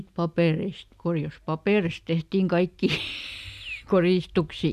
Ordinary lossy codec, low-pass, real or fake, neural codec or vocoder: none; 14.4 kHz; real; none